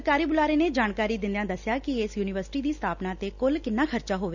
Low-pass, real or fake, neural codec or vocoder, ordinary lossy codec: 7.2 kHz; real; none; none